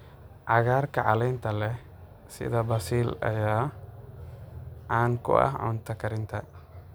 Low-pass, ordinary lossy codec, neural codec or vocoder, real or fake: none; none; none; real